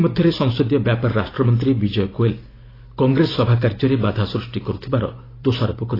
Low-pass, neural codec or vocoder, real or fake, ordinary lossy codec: 5.4 kHz; none; real; AAC, 24 kbps